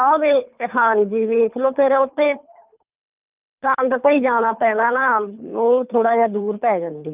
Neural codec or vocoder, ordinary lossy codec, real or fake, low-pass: codec, 24 kHz, 3 kbps, HILCodec; Opus, 32 kbps; fake; 3.6 kHz